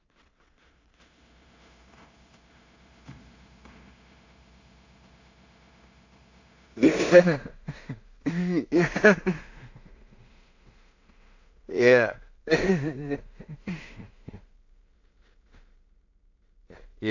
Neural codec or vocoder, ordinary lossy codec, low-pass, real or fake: codec, 16 kHz in and 24 kHz out, 0.4 kbps, LongCat-Audio-Codec, two codebook decoder; AAC, 48 kbps; 7.2 kHz; fake